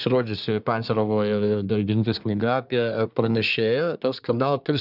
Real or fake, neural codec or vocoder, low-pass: fake; codec, 24 kHz, 1 kbps, SNAC; 5.4 kHz